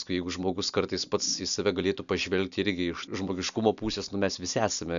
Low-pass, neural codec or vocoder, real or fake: 7.2 kHz; none; real